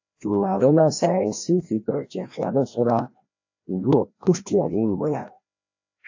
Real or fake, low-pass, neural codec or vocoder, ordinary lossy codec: fake; 7.2 kHz; codec, 16 kHz, 1 kbps, FreqCodec, larger model; AAC, 48 kbps